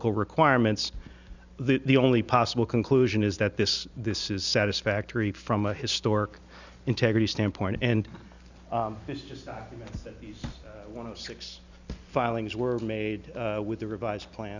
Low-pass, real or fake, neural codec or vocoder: 7.2 kHz; real; none